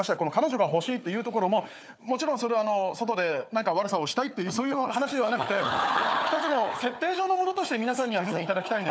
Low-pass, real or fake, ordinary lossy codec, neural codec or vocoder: none; fake; none; codec, 16 kHz, 4 kbps, FunCodec, trained on Chinese and English, 50 frames a second